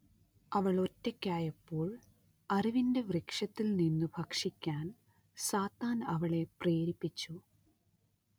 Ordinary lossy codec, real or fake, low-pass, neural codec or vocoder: none; real; none; none